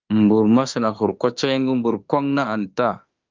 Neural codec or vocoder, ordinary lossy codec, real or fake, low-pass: autoencoder, 48 kHz, 32 numbers a frame, DAC-VAE, trained on Japanese speech; Opus, 24 kbps; fake; 7.2 kHz